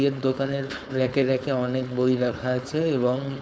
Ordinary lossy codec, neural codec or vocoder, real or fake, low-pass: none; codec, 16 kHz, 4.8 kbps, FACodec; fake; none